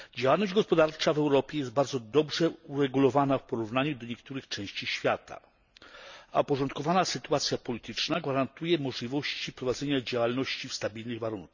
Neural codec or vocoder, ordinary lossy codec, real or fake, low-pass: none; none; real; 7.2 kHz